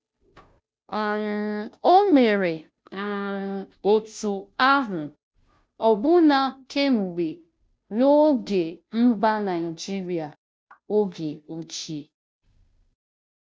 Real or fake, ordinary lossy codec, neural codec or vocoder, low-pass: fake; none; codec, 16 kHz, 0.5 kbps, FunCodec, trained on Chinese and English, 25 frames a second; none